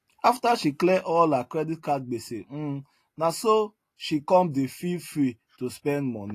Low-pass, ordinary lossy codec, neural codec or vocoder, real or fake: 14.4 kHz; AAC, 48 kbps; none; real